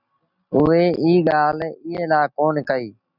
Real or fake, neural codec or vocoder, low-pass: real; none; 5.4 kHz